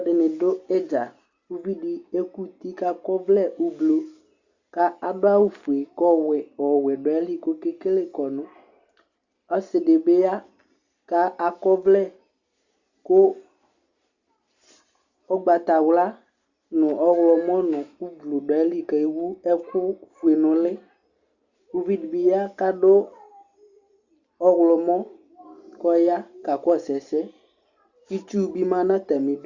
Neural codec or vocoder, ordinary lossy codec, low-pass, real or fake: none; Opus, 64 kbps; 7.2 kHz; real